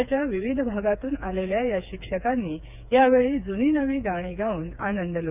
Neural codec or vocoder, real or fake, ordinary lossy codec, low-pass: codec, 16 kHz, 4 kbps, FreqCodec, smaller model; fake; none; 3.6 kHz